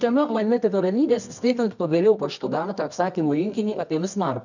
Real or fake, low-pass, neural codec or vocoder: fake; 7.2 kHz; codec, 24 kHz, 0.9 kbps, WavTokenizer, medium music audio release